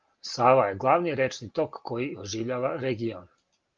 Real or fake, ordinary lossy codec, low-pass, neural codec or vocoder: real; Opus, 32 kbps; 7.2 kHz; none